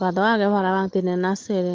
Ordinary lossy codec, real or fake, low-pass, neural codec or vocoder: Opus, 16 kbps; real; 7.2 kHz; none